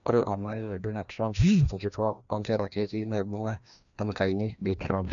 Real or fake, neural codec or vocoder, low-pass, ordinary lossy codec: fake; codec, 16 kHz, 1 kbps, FreqCodec, larger model; 7.2 kHz; none